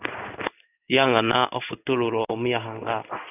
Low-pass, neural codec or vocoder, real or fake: 3.6 kHz; codec, 16 kHz in and 24 kHz out, 1 kbps, XY-Tokenizer; fake